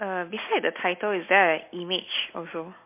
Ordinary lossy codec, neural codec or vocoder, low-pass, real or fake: MP3, 24 kbps; none; 3.6 kHz; real